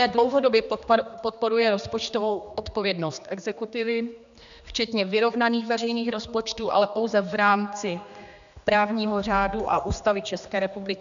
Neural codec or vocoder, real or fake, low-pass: codec, 16 kHz, 2 kbps, X-Codec, HuBERT features, trained on general audio; fake; 7.2 kHz